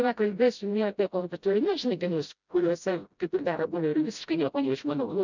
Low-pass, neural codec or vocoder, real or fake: 7.2 kHz; codec, 16 kHz, 0.5 kbps, FreqCodec, smaller model; fake